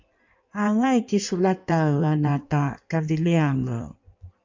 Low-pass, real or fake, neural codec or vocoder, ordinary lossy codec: 7.2 kHz; fake; codec, 16 kHz in and 24 kHz out, 1.1 kbps, FireRedTTS-2 codec; MP3, 64 kbps